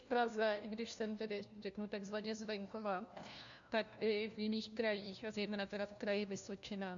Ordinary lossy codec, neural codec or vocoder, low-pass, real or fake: AAC, 64 kbps; codec, 16 kHz, 1 kbps, FunCodec, trained on LibriTTS, 50 frames a second; 7.2 kHz; fake